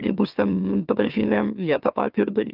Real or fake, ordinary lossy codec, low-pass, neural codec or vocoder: fake; Opus, 24 kbps; 5.4 kHz; autoencoder, 44.1 kHz, a latent of 192 numbers a frame, MeloTTS